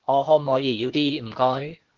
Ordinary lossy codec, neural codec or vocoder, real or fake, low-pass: Opus, 16 kbps; codec, 16 kHz, 0.8 kbps, ZipCodec; fake; 7.2 kHz